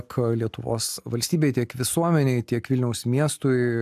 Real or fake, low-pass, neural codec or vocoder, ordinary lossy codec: real; 14.4 kHz; none; AAC, 96 kbps